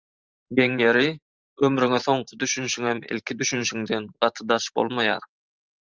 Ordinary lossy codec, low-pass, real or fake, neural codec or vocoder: Opus, 32 kbps; 7.2 kHz; fake; vocoder, 44.1 kHz, 128 mel bands every 512 samples, BigVGAN v2